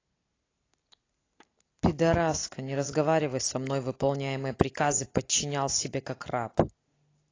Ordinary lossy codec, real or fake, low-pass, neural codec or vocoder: AAC, 32 kbps; real; 7.2 kHz; none